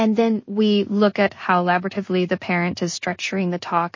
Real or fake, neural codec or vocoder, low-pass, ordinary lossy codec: fake; codec, 16 kHz in and 24 kHz out, 0.4 kbps, LongCat-Audio-Codec, two codebook decoder; 7.2 kHz; MP3, 32 kbps